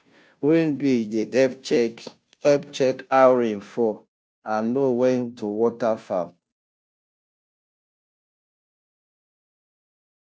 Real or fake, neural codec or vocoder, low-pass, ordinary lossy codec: fake; codec, 16 kHz, 0.5 kbps, FunCodec, trained on Chinese and English, 25 frames a second; none; none